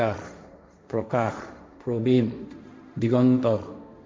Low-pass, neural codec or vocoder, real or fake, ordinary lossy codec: none; codec, 16 kHz, 1.1 kbps, Voila-Tokenizer; fake; none